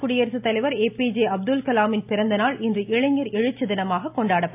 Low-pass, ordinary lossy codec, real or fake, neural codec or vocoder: 3.6 kHz; AAC, 32 kbps; real; none